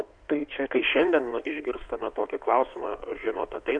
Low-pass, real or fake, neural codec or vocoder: 9.9 kHz; fake; codec, 16 kHz in and 24 kHz out, 2.2 kbps, FireRedTTS-2 codec